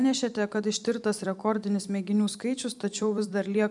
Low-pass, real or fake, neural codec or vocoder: 10.8 kHz; fake; vocoder, 44.1 kHz, 128 mel bands every 256 samples, BigVGAN v2